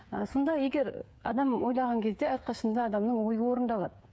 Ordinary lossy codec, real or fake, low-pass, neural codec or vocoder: none; fake; none; codec, 16 kHz, 8 kbps, FreqCodec, smaller model